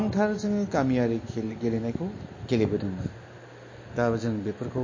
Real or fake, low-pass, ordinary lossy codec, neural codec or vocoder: real; 7.2 kHz; MP3, 32 kbps; none